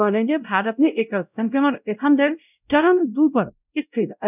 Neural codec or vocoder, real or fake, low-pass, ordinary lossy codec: codec, 16 kHz, 0.5 kbps, X-Codec, WavLM features, trained on Multilingual LibriSpeech; fake; 3.6 kHz; none